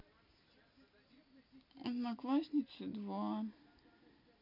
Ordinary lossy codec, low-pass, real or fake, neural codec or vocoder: none; 5.4 kHz; real; none